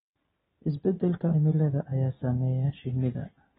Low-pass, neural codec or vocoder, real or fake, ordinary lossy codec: 14.4 kHz; none; real; AAC, 16 kbps